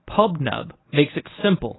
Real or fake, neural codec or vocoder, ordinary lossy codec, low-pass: fake; codec, 24 kHz, 0.9 kbps, WavTokenizer, medium speech release version 1; AAC, 16 kbps; 7.2 kHz